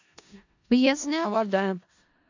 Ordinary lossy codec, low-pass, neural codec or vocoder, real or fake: AAC, 48 kbps; 7.2 kHz; codec, 16 kHz in and 24 kHz out, 0.4 kbps, LongCat-Audio-Codec, four codebook decoder; fake